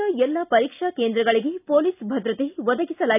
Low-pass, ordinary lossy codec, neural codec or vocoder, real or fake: 3.6 kHz; none; none; real